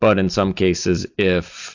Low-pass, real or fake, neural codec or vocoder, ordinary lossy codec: 7.2 kHz; real; none; AAC, 48 kbps